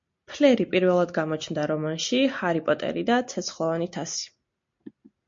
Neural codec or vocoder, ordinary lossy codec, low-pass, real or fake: none; MP3, 48 kbps; 7.2 kHz; real